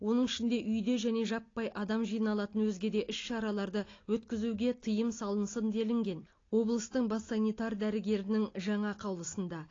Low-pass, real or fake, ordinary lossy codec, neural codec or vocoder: 7.2 kHz; real; AAC, 32 kbps; none